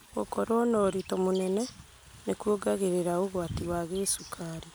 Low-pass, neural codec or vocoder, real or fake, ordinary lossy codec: none; none; real; none